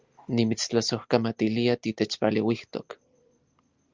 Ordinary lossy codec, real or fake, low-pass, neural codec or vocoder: Opus, 32 kbps; real; 7.2 kHz; none